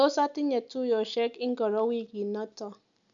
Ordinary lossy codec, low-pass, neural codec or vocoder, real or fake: none; 7.2 kHz; none; real